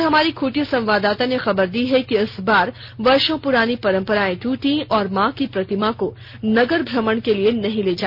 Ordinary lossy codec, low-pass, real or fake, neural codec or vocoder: none; 5.4 kHz; real; none